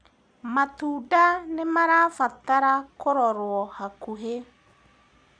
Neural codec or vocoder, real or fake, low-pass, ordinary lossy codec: none; real; 9.9 kHz; none